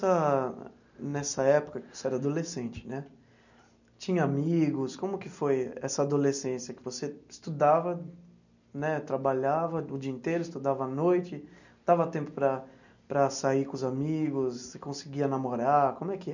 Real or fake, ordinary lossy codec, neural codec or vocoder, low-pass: real; none; none; 7.2 kHz